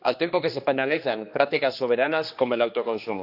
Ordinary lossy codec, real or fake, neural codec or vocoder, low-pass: none; fake; codec, 16 kHz, 2 kbps, X-Codec, HuBERT features, trained on general audio; 5.4 kHz